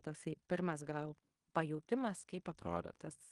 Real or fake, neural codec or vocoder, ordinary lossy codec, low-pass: fake; codec, 16 kHz in and 24 kHz out, 0.9 kbps, LongCat-Audio-Codec, fine tuned four codebook decoder; Opus, 24 kbps; 10.8 kHz